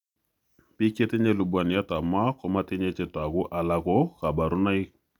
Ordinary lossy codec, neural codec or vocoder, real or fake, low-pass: none; none; real; 19.8 kHz